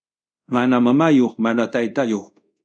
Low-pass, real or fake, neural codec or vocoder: 9.9 kHz; fake; codec, 24 kHz, 0.5 kbps, DualCodec